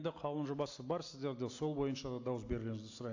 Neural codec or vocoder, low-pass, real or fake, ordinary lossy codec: none; 7.2 kHz; real; AAC, 48 kbps